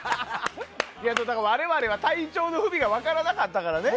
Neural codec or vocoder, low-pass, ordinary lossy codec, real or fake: none; none; none; real